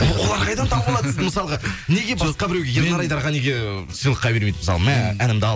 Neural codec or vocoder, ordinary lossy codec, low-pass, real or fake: none; none; none; real